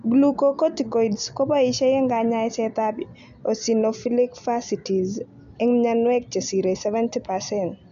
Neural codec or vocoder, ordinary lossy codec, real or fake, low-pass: none; none; real; 7.2 kHz